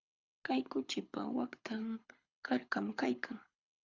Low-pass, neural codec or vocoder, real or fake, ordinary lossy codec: 7.2 kHz; codec, 24 kHz, 6 kbps, HILCodec; fake; Opus, 64 kbps